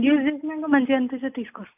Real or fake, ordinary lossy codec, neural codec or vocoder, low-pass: real; none; none; 3.6 kHz